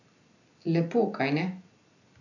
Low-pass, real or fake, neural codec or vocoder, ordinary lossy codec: 7.2 kHz; real; none; none